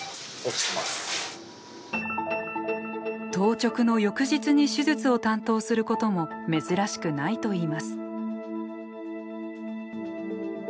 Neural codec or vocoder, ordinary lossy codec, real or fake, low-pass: none; none; real; none